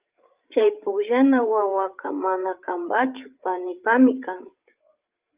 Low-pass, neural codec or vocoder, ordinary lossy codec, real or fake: 3.6 kHz; codec, 16 kHz, 8 kbps, FreqCodec, larger model; Opus, 32 kbps; fake